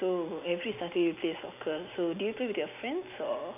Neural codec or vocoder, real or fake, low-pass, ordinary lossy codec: none; real; 3.6 kHz; none